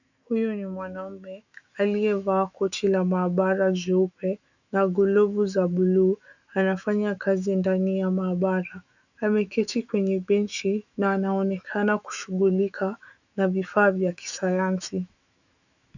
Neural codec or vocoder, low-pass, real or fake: autoencoder, 48 kHz, 128 numbers a frame, DAC-VAE, trained on Japanese speech; 7.2 kHz; fake